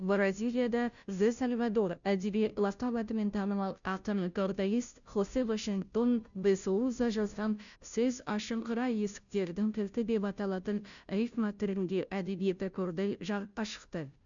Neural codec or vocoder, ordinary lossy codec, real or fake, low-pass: codec, 16 kHz, 0.5 kbps, FunCodec, trained on Chinese and English, 25 frames a second; none; fake; 7.2 kHz